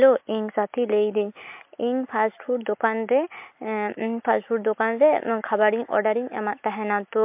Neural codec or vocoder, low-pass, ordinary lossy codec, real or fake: none; 3.6 kHz; MP3, 32 kbps; real